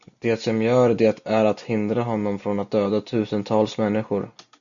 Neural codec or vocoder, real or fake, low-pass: none; real; 7.2 kHz